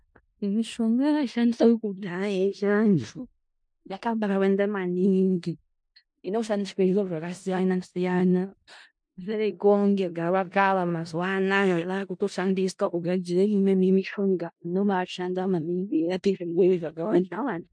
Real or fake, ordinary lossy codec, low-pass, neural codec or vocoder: fake; MP3, 64 kbps; 9.9 kHz; codec, 16 kHz in and 24 kHz out, 0.4 kbps, LongCat-Audio-Codec, four codebook decoder